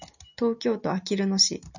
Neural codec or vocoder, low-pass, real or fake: none; 7.2 kHz; real